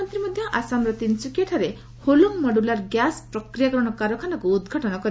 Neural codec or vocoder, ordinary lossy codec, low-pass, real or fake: none; none; none; real